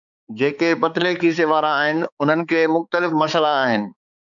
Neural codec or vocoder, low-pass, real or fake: codec, 16 kHz, 4 kbps, X-Codec, HuBERT features, trained on balanced general audio; 7.2 kHz; fake